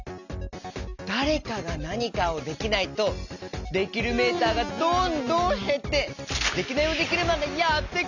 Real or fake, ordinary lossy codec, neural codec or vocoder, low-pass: real; none; none; 7.2 kHz